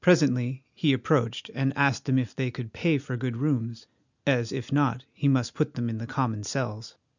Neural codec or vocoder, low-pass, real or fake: none; 7.2 kHz; real